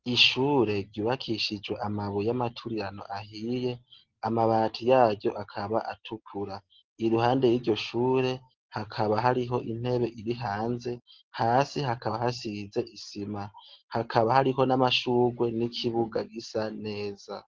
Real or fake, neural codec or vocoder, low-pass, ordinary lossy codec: real; none; 7.2 kHz; Opus, 16 kbps